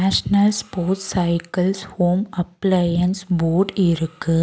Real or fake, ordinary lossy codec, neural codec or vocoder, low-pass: real; none; none; none